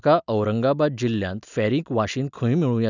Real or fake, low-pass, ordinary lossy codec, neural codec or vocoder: real; 7.2 kHz; none; none